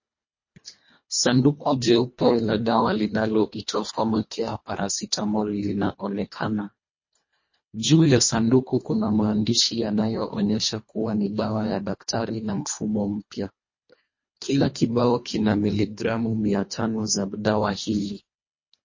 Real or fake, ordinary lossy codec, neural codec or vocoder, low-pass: fake; MP3, 32 kbps; codec, 24 kHz, 1.5 kbps, HILCodec; 7.2 kHz